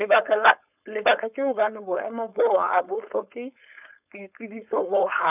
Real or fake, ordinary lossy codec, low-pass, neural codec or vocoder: fake; none; 3.6 kHz; codec, 16 kHz, 4.8 kbps, FACodec